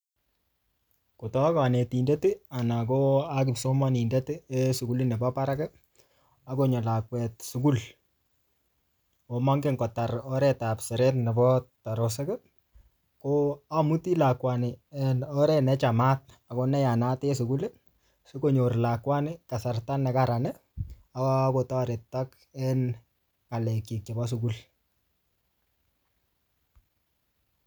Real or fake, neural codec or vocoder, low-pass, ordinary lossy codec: real; none; none; none